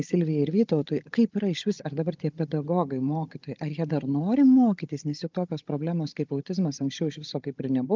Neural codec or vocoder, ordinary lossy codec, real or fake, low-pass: codec, 16 kHz, 16 kbps, FunCodec, trained on Chinese and English, 50 frames a second; Opus, 32 kbps; fake; 7.2 kHz